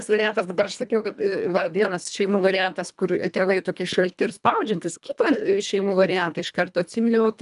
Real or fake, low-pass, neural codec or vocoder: fake; 10.8 kHz; codec, 24 kHz, 1.5 kbps, HILCodec